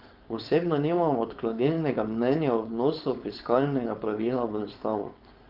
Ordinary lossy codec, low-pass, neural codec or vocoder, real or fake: Opus, 32 kbps; 5.4 kHz; codec, 16 kHz, 4.8 kbps, FACodec; fake